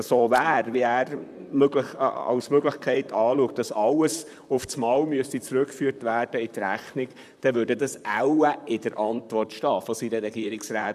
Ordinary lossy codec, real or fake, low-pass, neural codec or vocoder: none; fake; 14.4 kHz; vocoder, 44.1 kHz, 128 mel bands, Pupu-Vocoder